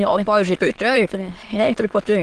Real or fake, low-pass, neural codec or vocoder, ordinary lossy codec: fake; 9.9 kHz; autoencoder, 22.05 kHz, a latent of 192 numbers a frame, VITS, trained on many speakers; Opus, 16 kbps